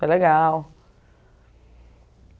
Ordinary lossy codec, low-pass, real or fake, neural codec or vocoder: none; none; real; none